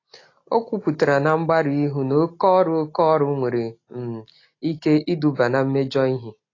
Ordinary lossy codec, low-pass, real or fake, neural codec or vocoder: AAC, 32 kbps; 7.2 kHz; real; none